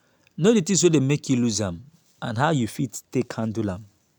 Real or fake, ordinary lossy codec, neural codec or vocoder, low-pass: real; none; none; none